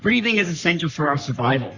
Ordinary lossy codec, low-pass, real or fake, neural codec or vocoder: Opus, 64 kbps; 7.2 kHz; fake; codec, 44.1 kHz, 3.4 kbps, Pupu-Codec